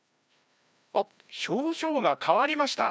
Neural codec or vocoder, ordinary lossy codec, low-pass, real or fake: codec, 16 kHz, 1 kbps, FreqCodec, larger model; none; none; fake